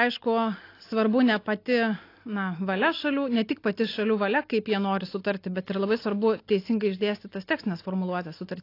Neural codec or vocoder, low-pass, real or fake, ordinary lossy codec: none; 5.4 kHz; real; AAC, 32 kbps